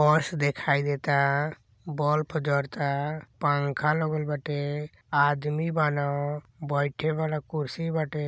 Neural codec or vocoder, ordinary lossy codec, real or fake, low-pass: none; none; real; none